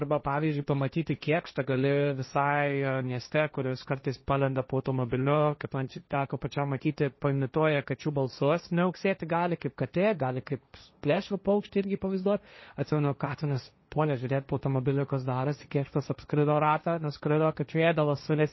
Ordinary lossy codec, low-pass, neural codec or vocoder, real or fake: MP3, 24 kbps; 7.2 kHz; codec, 16 kHz, 1.1 kbps, Voila-Tokenizer; fake